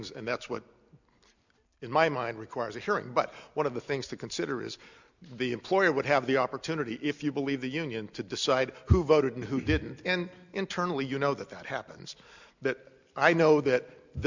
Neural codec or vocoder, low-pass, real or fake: none; 7.2 kHz; real